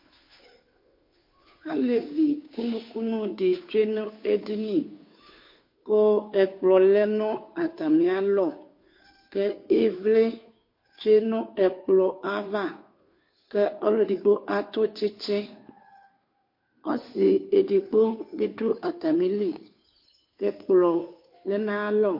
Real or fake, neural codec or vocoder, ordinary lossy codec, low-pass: fake; codec, 16 kHz, 2 kbps, FunCodec, trained on Chinese and English, 25 frames a second; MP3, 32 kbps; 5.4 kHz